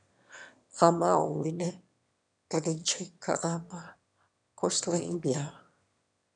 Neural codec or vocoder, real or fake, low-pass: autoencoder, 22.05 kHz, a latent of 192 numbers a frame, VITS, trained on one speaker; fake; 9.9 kHz